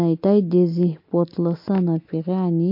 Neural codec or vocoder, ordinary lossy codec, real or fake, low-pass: none; MP3, 32 kbps; real; 5.4 kHz